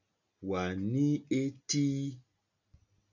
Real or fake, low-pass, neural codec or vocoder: real; 7.2 kHz; none